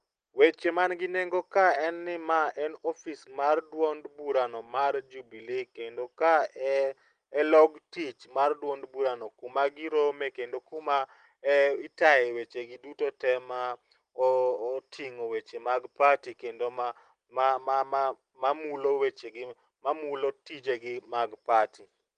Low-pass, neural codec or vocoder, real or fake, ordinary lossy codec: 9.9 kHz; none; real; Opus, 24 kbps